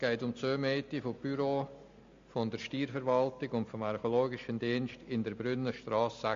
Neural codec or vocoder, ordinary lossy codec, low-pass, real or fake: none; MP3, 48 kbps; 7.2 kHz; real